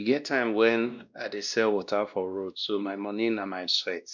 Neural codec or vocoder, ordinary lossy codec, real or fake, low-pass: codec, 16 kHz, 1 kbps, X-Codec, WavLM features, trained on Multilingual LibriSpeech; none; fake; 7.2 kHz